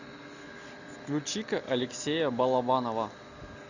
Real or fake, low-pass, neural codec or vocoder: real; 7.2 kHz; none